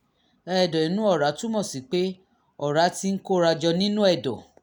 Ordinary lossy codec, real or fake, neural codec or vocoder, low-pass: none; real; none; none